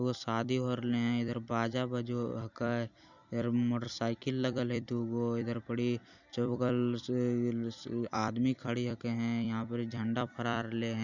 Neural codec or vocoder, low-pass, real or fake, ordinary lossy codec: vocoder, 44.1 kHz, 128 mel bands every 256 samples, BigVGAN v2; 7.2 kHz; fake; none